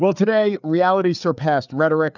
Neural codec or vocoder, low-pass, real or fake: codec, 16 kHz, 4 kbps, FreqCodec, larger model; 7.2 kHz; fake